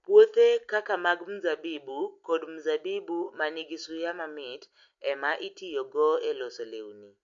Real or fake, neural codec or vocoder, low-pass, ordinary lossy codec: real; none; 7.2 kHz; AAC, 64 kbps